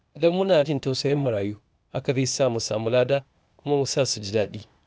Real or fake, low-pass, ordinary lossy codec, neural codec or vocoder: fake; none; none; codec, 16 kHz, 0.8 kbps, ZipCodec